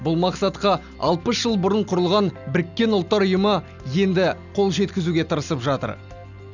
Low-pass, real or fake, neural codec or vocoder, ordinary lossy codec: 7.2 kHz; real; none; none